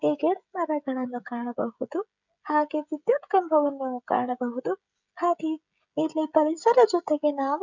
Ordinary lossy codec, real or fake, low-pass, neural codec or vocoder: none; fake; 7.2 kHz; codec, 16 kHz, 16 kbps, FreqCodec, smaller model